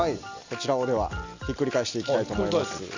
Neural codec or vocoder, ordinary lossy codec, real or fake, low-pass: none; Opus, 64 kbps; real; 7.2 kHz